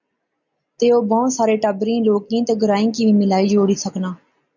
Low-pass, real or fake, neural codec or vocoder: 7.2 kHz; real; none